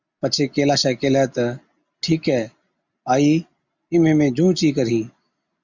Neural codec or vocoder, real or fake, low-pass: none; real; 7.2 kHz